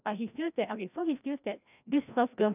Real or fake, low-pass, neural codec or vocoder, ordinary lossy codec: fake; 3.6 kHz; codec, 16 kHz, 1 kbps, FreqCodec, larger model; none